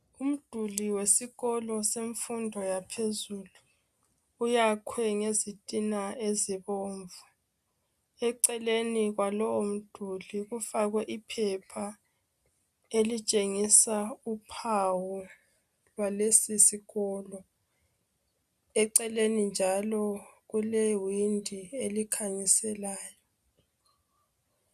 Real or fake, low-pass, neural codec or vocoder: real; 14.4 kHz; none